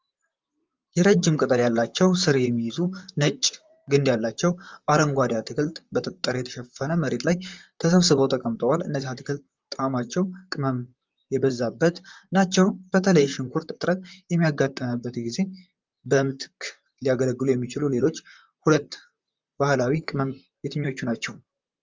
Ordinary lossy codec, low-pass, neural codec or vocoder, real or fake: Opus, 32 kbps; 7.2 kHz; vocoder, 44.1 kHz, 128 mel bands, Pupu-Vocoder; fake